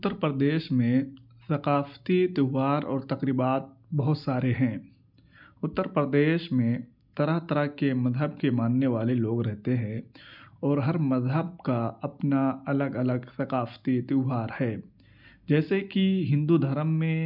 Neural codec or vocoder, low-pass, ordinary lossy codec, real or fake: none; 5.4 kHz; none; real